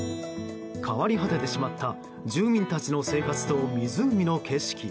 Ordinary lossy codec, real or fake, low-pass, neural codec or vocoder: none; real; none; none